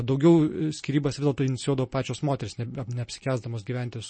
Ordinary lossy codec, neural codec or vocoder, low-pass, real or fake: MP3, 32 kbps; none; 9.9 kHz; real